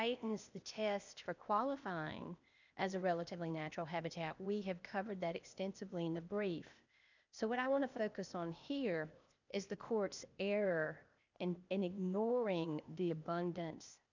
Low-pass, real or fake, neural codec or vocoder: 7.2 kHz; fake; codec, 16 kHz, 0.8 kbps, ZipCodec